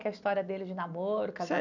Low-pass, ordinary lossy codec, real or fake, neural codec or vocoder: 7.2 kHz; none; real; none